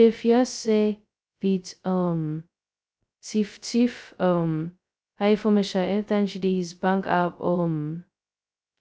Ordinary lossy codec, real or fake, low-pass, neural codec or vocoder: none; fake; none; codec, 16 kHz, 0.2 kbps, FocalCodec